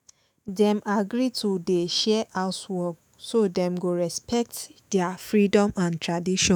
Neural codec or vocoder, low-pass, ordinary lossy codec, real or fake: autoencoder, 48 kHz, 128 numbers a frame, DAC-VAE, trained on Japanese speech; none; none; fake